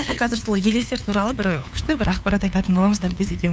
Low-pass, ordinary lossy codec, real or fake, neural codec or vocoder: none; none; fake; codec, 16 kHz, 2 kbps, FunCodec, trained on LibriTTS, 25 frames a second